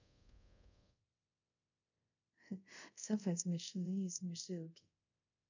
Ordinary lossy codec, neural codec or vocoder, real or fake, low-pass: none; codec, 24 kHz, 0.5 kbps, DualCodec; fake; 7.2 kHz